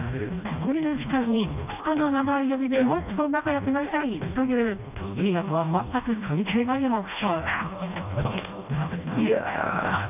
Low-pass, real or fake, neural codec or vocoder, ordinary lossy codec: 3.6 kHz; fake; codec, 16 kHz, 1 kbps, FreqCodec, smaller model; none